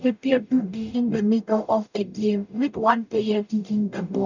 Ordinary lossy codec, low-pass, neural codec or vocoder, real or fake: none; 7.2 kHz; codec, 44.1 kHz, 0.9 kbps, DAC; fake